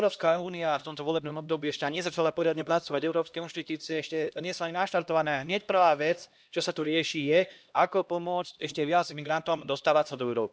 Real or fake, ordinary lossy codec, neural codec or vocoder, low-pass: fake; none; codec, 16 kHz, 1 kbps, X-Codec, HuBERT features, trained on LibriSpeech; none